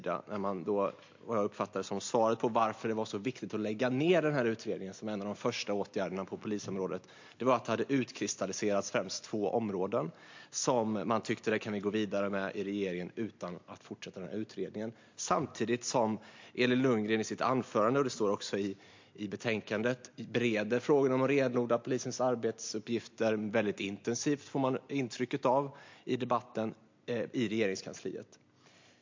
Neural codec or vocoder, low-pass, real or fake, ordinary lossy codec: none; 7.2 kHz; real; MP3, 48 kbps